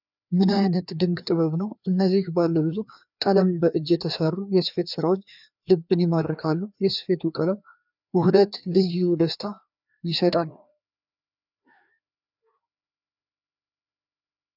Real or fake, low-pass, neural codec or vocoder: fake; 5.4 kHz; codec, 16 kHz, 2 kbps, FreqCodec, larger model